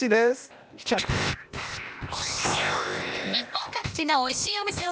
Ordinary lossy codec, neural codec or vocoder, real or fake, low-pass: none; codec, 16 kHz, 0.8 kbps, ZipCodec; fake; none